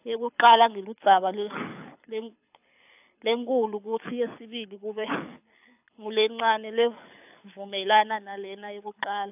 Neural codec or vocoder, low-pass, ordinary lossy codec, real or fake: codec, 24 kHz, 6 kbps, HILCodec; 3.6 kHz; AAC, 32 kbps; fake